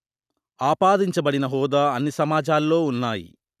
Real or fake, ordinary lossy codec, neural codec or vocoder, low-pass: real; none; none; 14.4 kHz